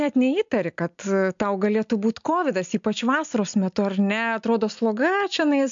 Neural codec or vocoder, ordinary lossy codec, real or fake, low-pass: none; MP3, 64 kbps; real; 7.2 kHz